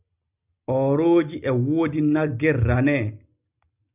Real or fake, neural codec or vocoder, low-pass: real; none; 3.6 kHz